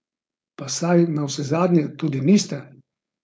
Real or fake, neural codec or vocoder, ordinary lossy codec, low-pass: fake; codec, 16 kHz, 4.8 kbps, FACodec; none; none